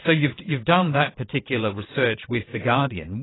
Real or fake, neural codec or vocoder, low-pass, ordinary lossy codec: fake; vocoder, 22.05 kHz, 80 mel bands, WaveNeXt; 7.2 kHz; AAC, 16 kbps